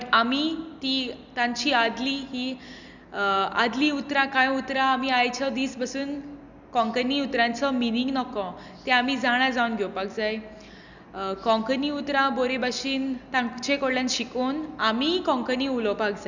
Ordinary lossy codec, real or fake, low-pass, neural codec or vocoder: none; real; 7.2 kHz; none